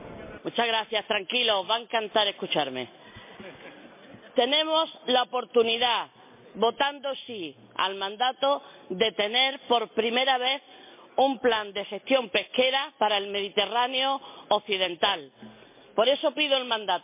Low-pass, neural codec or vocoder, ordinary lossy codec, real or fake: 3.6 kHz; none; MP3, 24 kbps; real